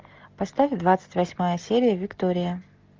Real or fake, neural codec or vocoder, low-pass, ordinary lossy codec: real; none; 7.2 kHz; Opus, 24 kbps